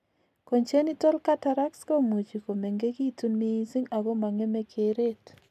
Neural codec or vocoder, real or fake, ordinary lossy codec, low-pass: none; real; none; 14.4 kHz